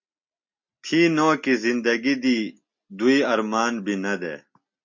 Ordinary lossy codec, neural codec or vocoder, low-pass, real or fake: MP3, 48 kbps; none; 7.2 kHz; real